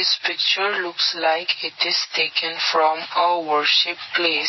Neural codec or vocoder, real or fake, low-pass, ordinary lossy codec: none; real; 7.2 kHz; MP3, 24 kbps